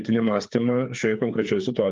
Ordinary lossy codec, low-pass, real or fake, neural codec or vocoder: Opus, 24 kbps; 7.2 kHz; fake; codec, 16 kHz, 4 kbps, FreqCodec, larger model